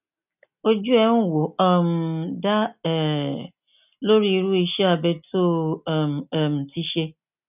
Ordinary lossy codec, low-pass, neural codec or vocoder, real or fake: none; 3.6 kHz; none; real